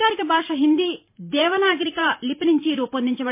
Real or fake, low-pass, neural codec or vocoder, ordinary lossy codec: fake; 3.6 kHz; vocoder, 44.1 kHz, 128 mel bands every 512 samples, BigVGAN v2; MP3, 24 kbps